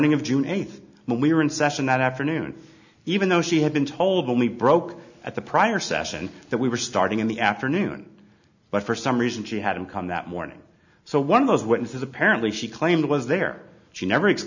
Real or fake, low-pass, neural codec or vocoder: real; 7.2 kHz; none